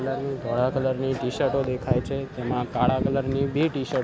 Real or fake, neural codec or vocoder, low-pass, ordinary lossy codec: real; none; none; none